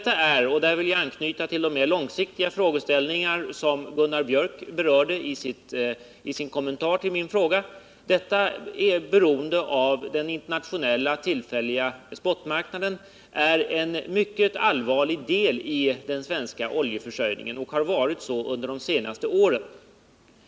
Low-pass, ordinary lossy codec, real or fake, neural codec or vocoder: none; none; real; none